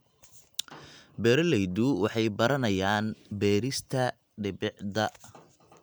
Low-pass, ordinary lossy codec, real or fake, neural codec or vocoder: none; none; real; none